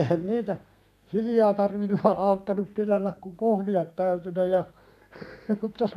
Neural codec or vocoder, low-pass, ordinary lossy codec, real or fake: codec, 32 kHz, 1.9 kbps, SNAC; 14.4 kHz; none; fake